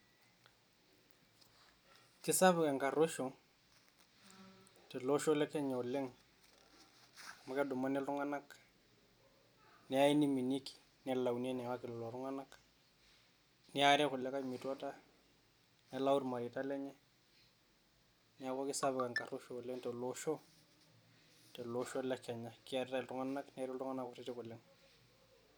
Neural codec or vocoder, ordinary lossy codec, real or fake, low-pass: none; none; real; none